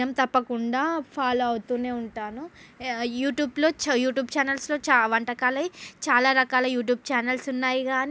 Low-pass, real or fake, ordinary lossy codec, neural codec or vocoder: none; real; none; none